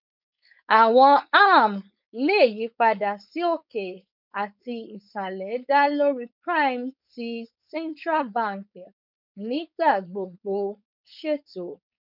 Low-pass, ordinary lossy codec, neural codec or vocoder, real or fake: 5.4 kHz; none; codec, 16 kHz, 4.8 kbps, FACodec; fake